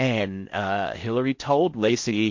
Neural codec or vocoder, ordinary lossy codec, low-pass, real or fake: codec, 16 kHz in and 24 kHz out, 0.8 kbps, FocalCodec, streaming, 65536 codes; MP3, 48 kbps; 7.2 kHz; fake